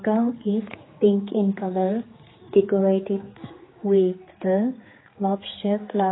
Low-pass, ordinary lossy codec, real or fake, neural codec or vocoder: 7.2 kHz; AAC, 16 kbps; fake; codec, 16 kHz, 4 kbps, X-Codec, HuBERT features, trained on general audio